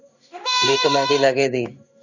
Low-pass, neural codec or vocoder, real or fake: 7.2 kHz; codec, 16 kHz in and 24 kHz out, 1 kbps, XY-Tokenizer; fake